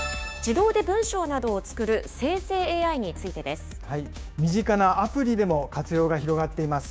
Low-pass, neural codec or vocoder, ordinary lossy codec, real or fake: none; codec, 16 kHz, 6 kbps, DAC; none; fake